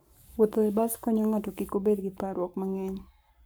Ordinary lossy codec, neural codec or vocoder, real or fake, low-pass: none; codec, 44.1 kHz, 7.8 kbps, Pupu-Codec; fake; none